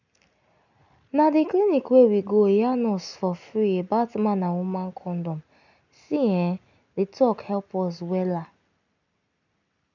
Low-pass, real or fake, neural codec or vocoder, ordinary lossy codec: 7.2 kHz; real; none; none